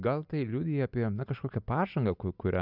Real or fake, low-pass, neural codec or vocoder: real; 5.4 kHz; none